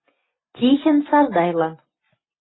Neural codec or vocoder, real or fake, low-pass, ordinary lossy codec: none; real; 7.2 kHz; AAC, 16 kbps